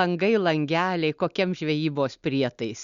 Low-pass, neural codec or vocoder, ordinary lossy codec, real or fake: 7.2 kHz; none; Opus, 64 kbps; real